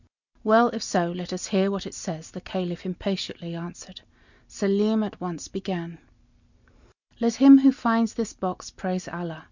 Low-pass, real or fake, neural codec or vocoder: 7.2 kHz; real; none